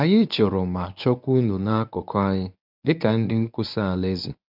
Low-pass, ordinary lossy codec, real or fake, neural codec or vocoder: 5.4 kHz; none; fake; codec, 24 kHz, 0.9 kbps, WavTokenizer, medium speech release version 1